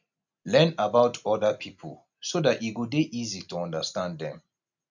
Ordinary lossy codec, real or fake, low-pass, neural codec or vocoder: none; real; 7.2 kHz; none